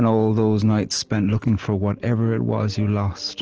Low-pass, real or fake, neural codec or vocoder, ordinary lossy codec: 7.2 kHz; real; none; Opus, 16 kbps